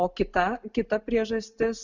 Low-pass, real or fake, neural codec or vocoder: 7.2 kHz; real; none